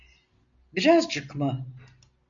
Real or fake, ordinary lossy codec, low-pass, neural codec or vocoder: real; MP3, 64 kbps; 7.2 kHz; none